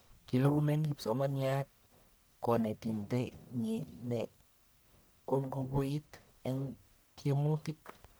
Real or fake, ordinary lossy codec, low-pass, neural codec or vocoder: fake; none; none; codec, 44.1 kHz, 1.7 kbps, Pupu-Codec